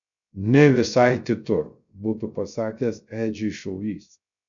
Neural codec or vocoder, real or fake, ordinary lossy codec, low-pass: codec, 16 kHz, 0.3 kbps, FocalCodec; fake; MP3, 96 kbps; 7.2 kHz